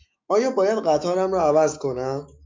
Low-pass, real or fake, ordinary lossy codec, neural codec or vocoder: 7.2 kHz; fake; MP3, 64 kbps; autoencoder, 48 kHz, 128 numbers a frame, DAC-VAE, trained on Japanese speech